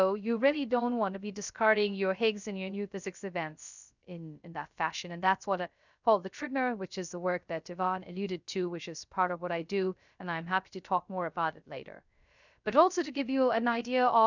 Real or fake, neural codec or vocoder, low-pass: fake; codec, 16 kHz, 0.3 kbps, FocalCodec; 7.2 kHz